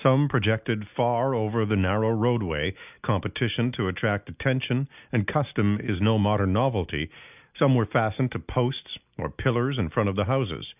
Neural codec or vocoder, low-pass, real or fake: none; 3.6 kHz; real